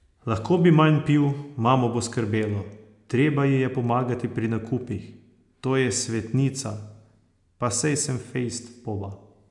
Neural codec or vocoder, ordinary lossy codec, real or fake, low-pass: none; none; real; 10.8 kHz